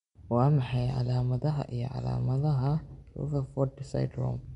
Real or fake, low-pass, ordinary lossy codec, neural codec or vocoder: real; 10.8 kHz; MP3, 64 kbps; none